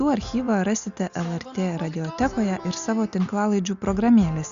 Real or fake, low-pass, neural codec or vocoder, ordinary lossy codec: real; 7.2 kHz; none; Opus, 64 kbps